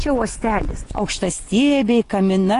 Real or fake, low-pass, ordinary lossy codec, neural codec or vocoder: fake; 10.8 kHz; AAC, 48 kbps; vocoder, 24 kHz, 100 mel bands, Vocos